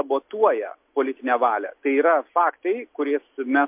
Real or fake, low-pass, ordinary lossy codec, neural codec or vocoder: real; 3.6 kHz; MP3, 32 kbps; none